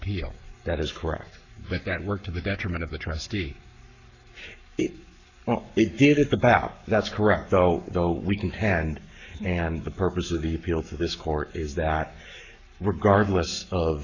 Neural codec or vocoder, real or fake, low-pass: codec, 44.1 kHz, 7.8 kbps, Pupu-Codec; fake; 7.2 kHz